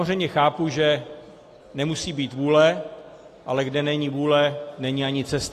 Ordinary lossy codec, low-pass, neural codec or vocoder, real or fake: AAC, 48 kbps; 14.4 kHz; none; real